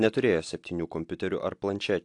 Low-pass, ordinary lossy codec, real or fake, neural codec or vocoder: 10.8 kHz; AAC, 64 kbps; real; none